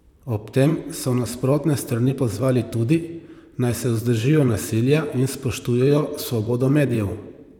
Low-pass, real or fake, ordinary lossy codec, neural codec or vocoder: 19.8 kHz; fake; none; vocoder, 44.1 kHz, 128 mel bands, Pupu-Vocoder